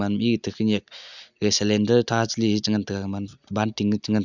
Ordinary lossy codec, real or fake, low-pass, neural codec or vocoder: none; real; 7.2 kHz; none